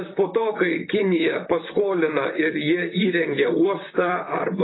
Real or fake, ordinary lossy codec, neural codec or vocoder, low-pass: fake; AAC, 16 kbps; vocoder, 44.1 kHz, 128 mel bands, Pupu-Vocoder; 7.2 kHz